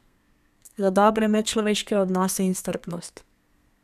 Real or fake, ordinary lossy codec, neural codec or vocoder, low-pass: fake; none; codec, 32 kHz, 1.9 kbps, SNAC; 14.4 kHz